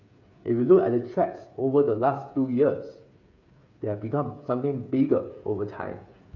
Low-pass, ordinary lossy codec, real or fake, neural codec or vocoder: 7.2 kHz; none; fake; codec, 16 kHz, 8 kbps, FreqCodec, smaller model